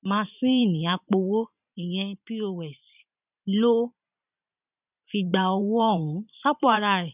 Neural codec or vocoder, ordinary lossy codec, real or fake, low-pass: vocoder, 44.1 kHz, 128 mel bands every 512 samples, BigVGAN v2; none; fake; 3.6 kHz